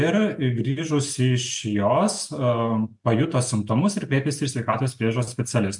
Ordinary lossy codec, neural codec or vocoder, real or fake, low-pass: MP3, 64 kbps; none; real; 10.8 kHz